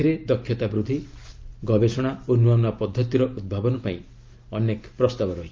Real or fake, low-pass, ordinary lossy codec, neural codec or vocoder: real; 7.2 kHz; Opus, 24 kbps; none